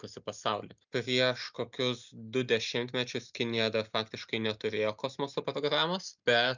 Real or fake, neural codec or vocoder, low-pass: real; none; 7.2 kHz